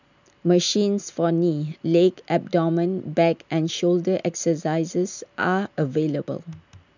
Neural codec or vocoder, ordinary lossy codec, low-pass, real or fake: none; none; 7.2 kHz; real